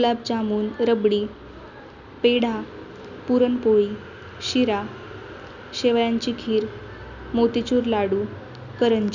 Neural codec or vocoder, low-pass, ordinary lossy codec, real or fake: none; 7.2 kHz; none; real